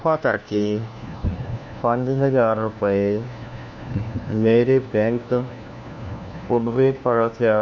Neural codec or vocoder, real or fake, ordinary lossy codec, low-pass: codec, 16 kHz, 1 kbps, FunCodec, trained on LibriTTS, 50 frames a second; fake; none; none